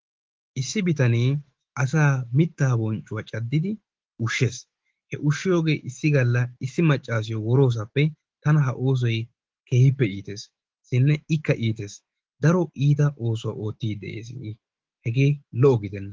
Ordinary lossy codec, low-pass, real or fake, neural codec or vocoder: Opus, 16 kbps; 7.2 kHz; fake; autoencoder, 48 kHz, 128 numbers a frame, DAC-VAE, trained on Japanese speech